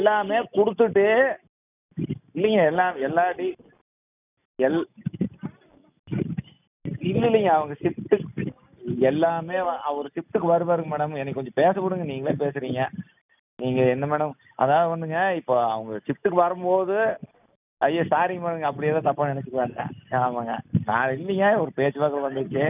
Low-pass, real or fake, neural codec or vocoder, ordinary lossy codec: 3.6 kHz; real; none; none